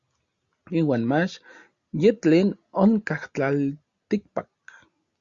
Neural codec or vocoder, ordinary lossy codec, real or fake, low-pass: none; Opus, 64 kbps; real; 7.2 kHz